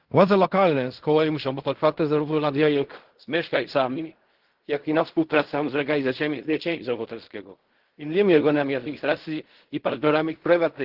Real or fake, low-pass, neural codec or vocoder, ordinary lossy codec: fake; 5.4 kHz; codec, 16 kHz in and 24 kHz out, 0.4 kbps, LongCat-Audio-Codec, fine tuned four codebook decoder; Opus, 16 kbps